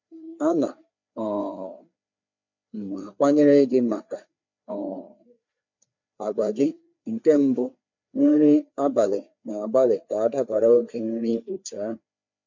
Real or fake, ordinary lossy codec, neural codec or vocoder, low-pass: fake; MP3, 64 kbps; codec, 16 kHz, 4 kbps, FreqCodec, larger model; 7.2 kHz